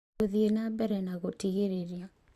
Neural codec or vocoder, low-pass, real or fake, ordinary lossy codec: vocoder, 44.1 kHz, 128 mel bands every 512 samples, BigVGAN v2; 14.4 kHz; fake; Opus, 64 kbps